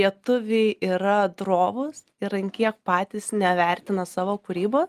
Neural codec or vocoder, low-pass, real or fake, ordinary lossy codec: none; 14.4 kHz; real; Opus, 32 kbps